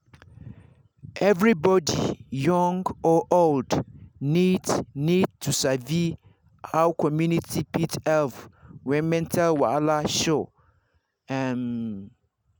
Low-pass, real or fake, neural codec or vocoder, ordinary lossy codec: none; real; none; none